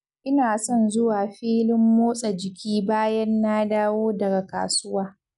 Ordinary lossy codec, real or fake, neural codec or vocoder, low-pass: none; real; none; 10.8 kHz